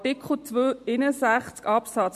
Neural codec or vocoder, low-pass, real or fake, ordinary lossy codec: none; 14.4 kHz; real; MP3, 64 kbps